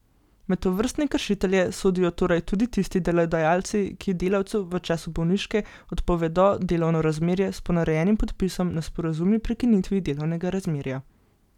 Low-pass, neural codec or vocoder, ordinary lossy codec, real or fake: 19.8 kHz; none; none; real